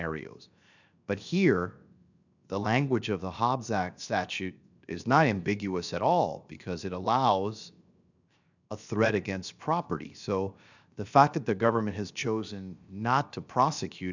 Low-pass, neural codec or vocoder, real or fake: 7.2 kHz; codec, 16 kHz, 0.7 kbps, FocalCodec; fake